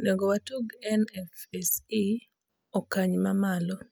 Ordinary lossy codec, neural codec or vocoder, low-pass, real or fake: none; none; none; real